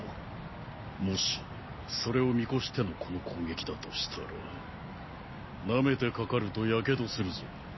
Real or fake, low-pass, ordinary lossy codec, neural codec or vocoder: real; 7.2 kHz; MP3, 24 kbps; none